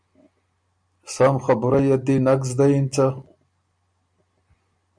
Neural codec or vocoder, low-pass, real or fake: none; 9.9 kHz; real